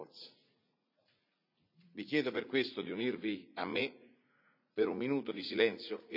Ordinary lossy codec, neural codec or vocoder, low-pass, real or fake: none; vocoder, 44.1 kHz, 80 mel bands, Vocos; 5.4 kHz; fake